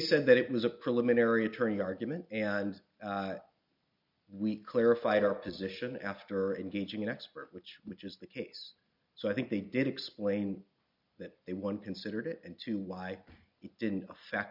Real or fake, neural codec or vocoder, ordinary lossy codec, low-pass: real; none; MP3, 48 kbps; 5.4 kHz